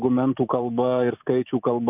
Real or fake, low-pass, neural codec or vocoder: fake; 3.6 kHz; vocoder, 44.1 kHz, 128 mel bands every 512 samples, BigVGAN v2